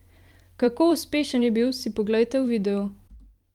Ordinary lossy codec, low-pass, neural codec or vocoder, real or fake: Opus, 32 kbps; 19.8 kHz; vocoder, 44.1 kHz, 128 mel bands every 512 samples, BigVGAN v2; fake